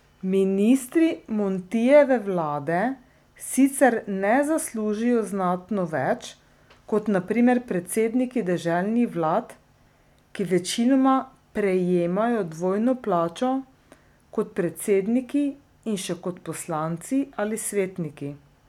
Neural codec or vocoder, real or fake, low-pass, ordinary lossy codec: none; real; 19.8 kHz; none